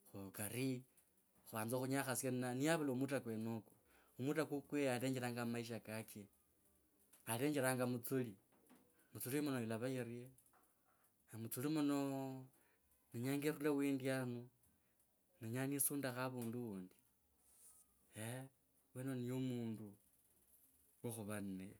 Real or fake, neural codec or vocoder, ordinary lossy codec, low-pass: real; none; none; none